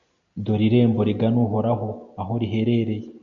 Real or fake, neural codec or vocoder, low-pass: real; none; 7.2 kHz